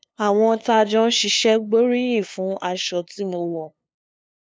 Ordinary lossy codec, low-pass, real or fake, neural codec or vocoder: none; none; fake; codec, 16 kHz, 2 kbps, FunCodec, trained on LibriTTS, 25 frames a second